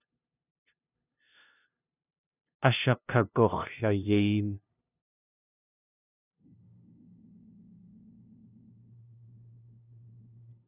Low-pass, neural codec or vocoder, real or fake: 3.6 kHz; codec, 16 kHz, 0.5 kbps, FunCodec, trained on LibriTTS, 25 frames a second; fake